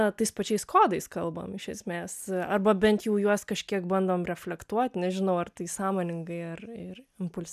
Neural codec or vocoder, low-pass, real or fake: none; 14.4 kHz; real